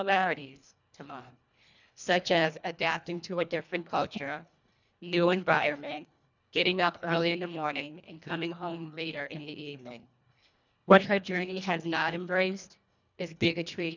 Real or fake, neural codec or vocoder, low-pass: fake; codec, 24 kHz, 1.5 kbps, HILCodec; 7.2 kHz